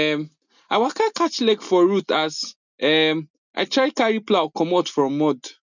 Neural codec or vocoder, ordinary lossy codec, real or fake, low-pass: none; none; real; 7.2 kHz